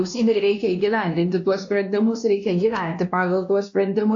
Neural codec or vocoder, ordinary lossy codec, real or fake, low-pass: codec, 16 kHz, 1 kbps, X-Codec, WavLM features, trained on Multilingual LibriSpeech; AAC, 64 kbps; fake; 7.2 kHz